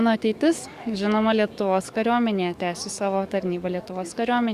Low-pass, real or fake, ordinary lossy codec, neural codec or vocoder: 14.4 kHz; fake; Opus, 64 kbps; codec, 44.1 kHz, 7.8 kbps, DAC